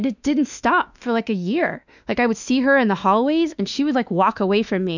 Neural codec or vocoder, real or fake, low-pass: autoencoder, 48 kHz, 32 numbers a frame, DAC-VAE, trained on Japanese speech; fake; 7.2 kHz